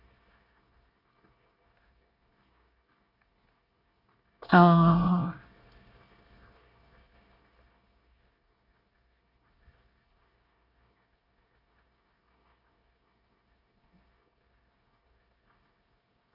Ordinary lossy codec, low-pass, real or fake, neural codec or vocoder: none; 5.4 kHz; fake; codec, 24 kHz, 1 kbps, SNAC